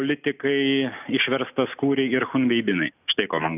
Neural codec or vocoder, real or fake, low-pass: none; real; 3.6 kHz